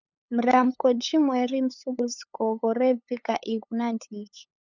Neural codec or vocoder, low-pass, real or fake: codec, 16 kHz, 8 kbps, FunCodec, trained on LibriTTS, 25 frames a second; 7.2 kHz; fake